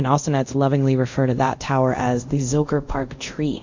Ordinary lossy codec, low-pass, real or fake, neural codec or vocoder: MP3, 64 kbps; 7.2 kHz; fake; codec, 24 kHz, 0.5 kbps, DualCodec